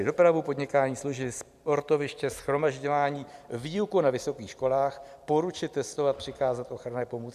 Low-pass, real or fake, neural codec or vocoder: 14.4 kHz; fake; vocoder, 44.1 kHz, 128 mel bands every 256 samples, BigVGAN v2